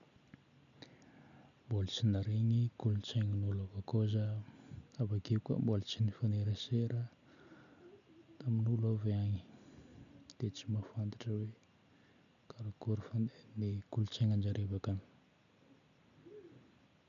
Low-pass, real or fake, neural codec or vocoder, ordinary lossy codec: 7.2 kHz; real; none; none